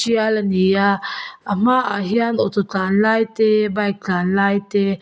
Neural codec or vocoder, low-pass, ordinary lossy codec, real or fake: none; none; none; real